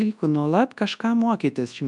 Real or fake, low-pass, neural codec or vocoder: fake; 10.8 kHz; codec, 24 kHz, 0.9 kbps, WavTokenizer, large speech release